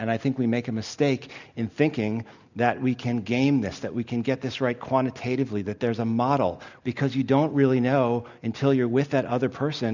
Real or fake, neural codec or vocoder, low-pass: real; none; 7.2 kHz